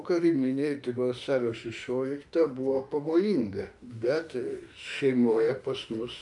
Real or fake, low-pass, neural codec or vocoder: fake; 10.8 kHz; autoencoder, 48 kHz, 32 numbers a frame, DAC-VAE, trained on Japanese speech